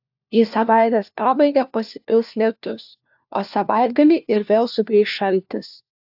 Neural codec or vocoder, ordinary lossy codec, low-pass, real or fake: codec, 16 kHz, 1 kbps, FunCodec, trained on LibriTTS, 50 frames a second; AAC, 48 kbps; 5.4 kHz; fake